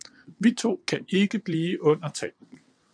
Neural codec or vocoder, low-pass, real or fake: vocoder, 22.05 kHz, 80 mel bands, WaveNeXt; 9.9 kHz; fake